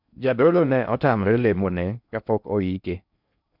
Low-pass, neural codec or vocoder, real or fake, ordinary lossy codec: 5.4 kHz; codec, 16 kHz in and 24 kHz out, 0.6 kbps, FocalCodec, streaming, 4096 codes; fake; none